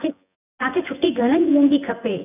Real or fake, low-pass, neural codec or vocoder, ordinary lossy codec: fake; 3.6 kHz; vocoder, 24 kHz, 100 mel bands, Vocos; none